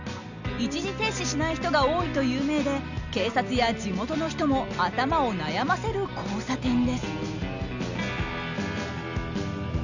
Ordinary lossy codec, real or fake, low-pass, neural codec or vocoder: none; real; 7.2 kHz; none